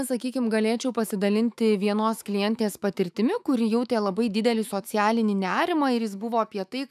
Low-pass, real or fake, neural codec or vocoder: 14.4 kHz; fake; autoencoder, 48 kHz, 128 numbers a frame, DAC-VAE, trained on Japanese speech